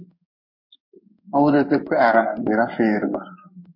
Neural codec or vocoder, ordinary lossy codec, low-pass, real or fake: codec, 16 kHz in and 24 kHz out, 1 kbps, XY-Tokenizer; MP3, 32 kbps; 5.4 kHz; fake